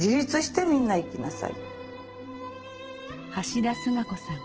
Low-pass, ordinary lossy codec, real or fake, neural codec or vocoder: 7.2 kHz; Opus, 16 kbps; fake; vocoder, 44.1 kHz, 128 mel bands every 512 samples, BigVGAN v2